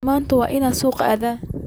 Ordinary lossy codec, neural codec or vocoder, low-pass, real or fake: none; vocoder, 44.1 kHz, 128 mel bands, Pupu-Vocoder; none; fake